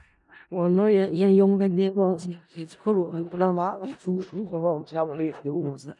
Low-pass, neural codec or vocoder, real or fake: 10.8 kHz; codec, 16 kHz in and 24 kHz out, 0.4 kbps, LongCat-Audio-Codec, four codebook decoder; fake